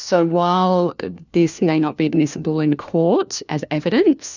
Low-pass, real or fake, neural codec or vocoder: 7.2 kHz; fake; codec, 16 kHz, 1 kbps, FunCodec, trained on LibriTTS, 50 frames a second